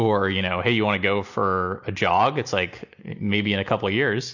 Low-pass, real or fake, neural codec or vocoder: 7.2 kHz; real; none